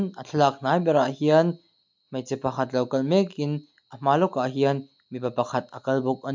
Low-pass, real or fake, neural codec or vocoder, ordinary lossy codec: 7.2 kHz; real; none; MP3, 64 kbps